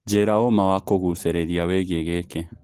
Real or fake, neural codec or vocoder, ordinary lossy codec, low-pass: real; none; Opus, 16 kbps; 14.4 kHz